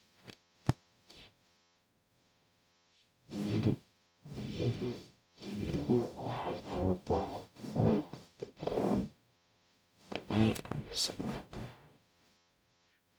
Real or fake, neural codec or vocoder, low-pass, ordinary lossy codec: fake; codec, 44.1 kHz, 0.9 kbps, DAC; none; none